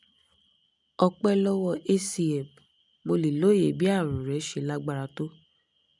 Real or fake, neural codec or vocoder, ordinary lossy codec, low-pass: real; none; none; 10.8 kHz